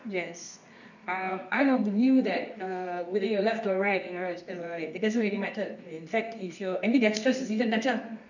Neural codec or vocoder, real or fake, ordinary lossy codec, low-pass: codec, 24 kHz, 0.9 kbps, WavTokenizer, medium music audio release; fake; none; 7.2 kHz